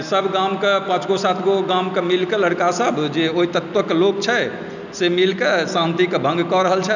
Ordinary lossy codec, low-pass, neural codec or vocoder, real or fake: none; 7.2 kHz; none; real